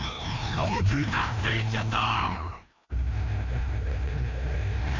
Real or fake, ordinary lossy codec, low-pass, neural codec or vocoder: fake; MP3, 48 kbps; 7.2 kHz; codec, 16 kHz, 1 kbps, FreqCodec, larger model